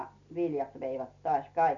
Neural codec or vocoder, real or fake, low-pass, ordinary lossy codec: none; real; 7.2 kHz; none